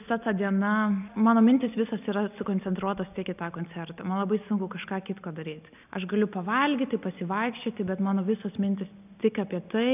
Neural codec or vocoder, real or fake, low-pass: none; real; 3.6 kHz